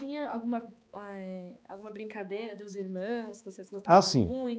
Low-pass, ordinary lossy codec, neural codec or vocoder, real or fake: none; none; codec, 16 kHz, 2 kbps, X-Codec, HuBERT features, trained on balanced general audio; fake